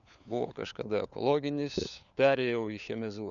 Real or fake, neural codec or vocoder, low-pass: fake; codec, 16 kHz, 4 kbps, FunCodec, trained on LibriTTS, 50 frames a second; 7.2 kHz